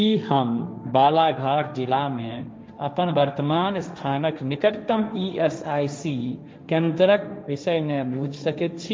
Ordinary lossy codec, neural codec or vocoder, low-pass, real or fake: none; codec, 16 kHz, 1.1 kbps, Voila-Tokenizer; none; fake